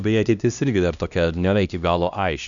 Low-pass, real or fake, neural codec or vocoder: 7.2 kHz; fake; codec, 16 kHz, 1 kbps, X-Codec, HuBERT features, trained on LibriSpeech